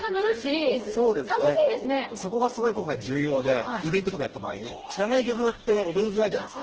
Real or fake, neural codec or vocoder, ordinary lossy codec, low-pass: fake; codec, 16 kHz, 1 kbps, FreqCodec, smaller model; Opus, 16 kbps; 7.2 kHz